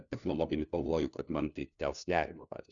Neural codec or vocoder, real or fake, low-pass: codec, 16 kHz, 1 kbps, FunCodec, trained on LibriTTS, 50 frames a second; fake; 7.2 kHz